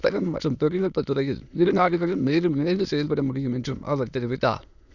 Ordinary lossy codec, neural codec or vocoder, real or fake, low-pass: none; autoencoder, 22.05 kHz, a latent of 192 numbers a frame, VITS, trained on many speakers; fake; 7.2 kHz